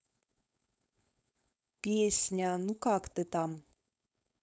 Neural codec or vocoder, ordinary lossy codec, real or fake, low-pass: codec, 16 kHz, 4.8 kbps, FACodec; none; fake; none